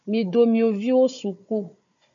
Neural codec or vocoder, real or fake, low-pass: codec, 16 kHz, 4 kbps, FunCodec, trained on Chinese and English, 50 frames a second; fake; 7.2 kHz